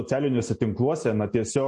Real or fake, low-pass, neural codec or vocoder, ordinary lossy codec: real; 10.8 kHz; none; MP3, 48 kbps